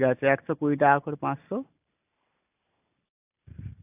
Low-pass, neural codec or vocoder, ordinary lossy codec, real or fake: 3.6 kHz; none; AAC, 32 kbps; real